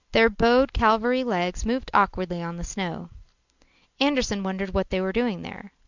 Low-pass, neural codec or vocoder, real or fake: 7.2 kHz; none; real